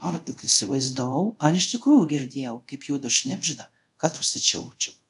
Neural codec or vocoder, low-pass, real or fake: codec, 24 kHz, 0.5 kbps, DualCodec; 10.8 kHz; fake